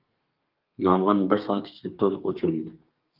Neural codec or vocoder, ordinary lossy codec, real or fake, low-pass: codec, 32 kHz, 1.9 kbps, SNAC; Opus, 24 kbps; fake; 5.4 kHz